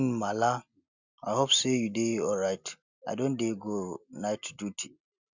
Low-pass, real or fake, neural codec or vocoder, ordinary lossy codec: 7.2 kHz; real; none; none